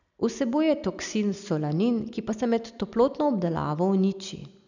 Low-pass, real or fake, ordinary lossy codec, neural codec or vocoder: 7.2 kHz; real; none; none